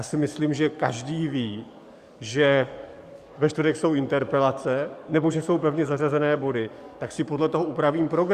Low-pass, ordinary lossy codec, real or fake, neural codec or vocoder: 14.4 kHz; Opus, 64 kbps; fake; codec, 44.1 kHz, 7.8 kbps, DAC